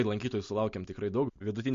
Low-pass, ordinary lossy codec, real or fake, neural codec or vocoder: 7.2 kHz; MP3, 48 kbps; real; none